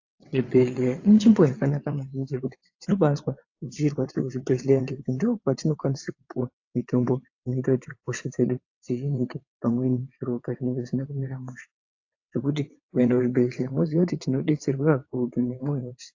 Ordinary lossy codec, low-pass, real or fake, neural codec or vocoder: AAC, 48 kbps; 7.2 kHz; fake; vocoder, 22.05 kHz, 80 mel bands, WaveNeXt